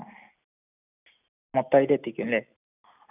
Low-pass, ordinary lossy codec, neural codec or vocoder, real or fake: 3.6 kHz; none; none; real